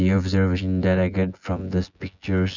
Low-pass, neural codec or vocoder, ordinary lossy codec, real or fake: 7.2 kHz; vocoder, 24 kHz, 100 mel bands, Vocos; none; fake